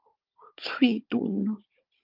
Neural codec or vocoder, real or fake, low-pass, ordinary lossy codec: codec, 16 kHz, 16 kbps, FunCodec, trained on Chinese and English, 50 frames a second; fake; 5.4 kHz; Opus, 24 kbps